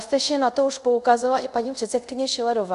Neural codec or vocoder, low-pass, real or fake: codec, 24 kHz, 0.5 kbps, DualCodec; 10.8 kHz; fake